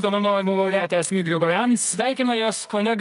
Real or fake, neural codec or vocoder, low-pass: fake; codec, 24 kHz, 0.9 kbps, WavTokenizer, medium music audio release; 10.8 kHz